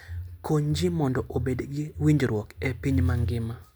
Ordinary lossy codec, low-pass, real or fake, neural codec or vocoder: none; none; real; none